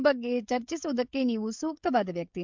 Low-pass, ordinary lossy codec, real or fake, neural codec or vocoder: 7.2 kHz; MP3, 64 kbps; fake; codec, 16 kHz, 16 kbps, FreqCodec, smaller model